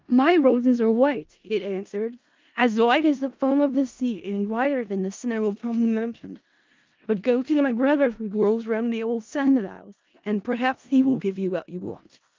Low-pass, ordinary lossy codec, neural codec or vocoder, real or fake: 7.2 kHz; Opus, 24 kbps; codec, 16 kHz in and 24 kHz out, 0.4 kbps, LongCat-Audio-Codec, four codebook decoder; fake